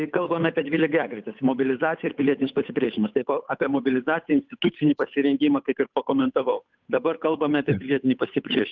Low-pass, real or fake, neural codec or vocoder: 7.2 kHz; fake; codec, 16 kHz, 8 kbps, FunCodec, trained on Chinese and English, 25 frames a second